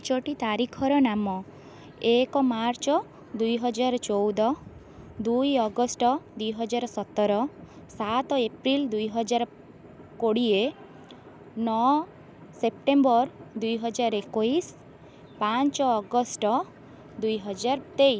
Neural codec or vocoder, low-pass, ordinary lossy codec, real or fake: none; none; none; real